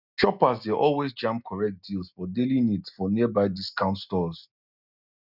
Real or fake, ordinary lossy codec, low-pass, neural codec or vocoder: real; none; 5.4 kHz; none